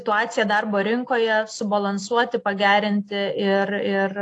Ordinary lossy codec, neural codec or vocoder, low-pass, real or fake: AAC, 48 kbps; none; 10.8 kHz; real